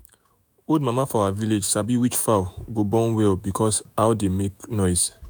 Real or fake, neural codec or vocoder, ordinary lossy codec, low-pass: fake; autoencoder, 48 kHz, 128 numbers a frame, DAC-VAE, trained on Japanese speech; none; none